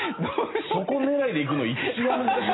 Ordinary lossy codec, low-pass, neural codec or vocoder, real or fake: AAC, 16 kbps; 7.2 kHz; none; real